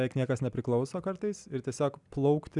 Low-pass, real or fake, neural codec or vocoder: 10.8 kHz; real; none